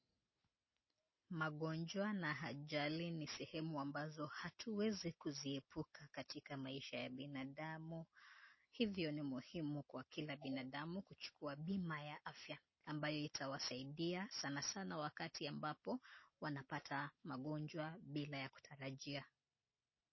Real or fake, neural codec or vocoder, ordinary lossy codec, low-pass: real; none; MP3, 24 kbps; 7.2 kHz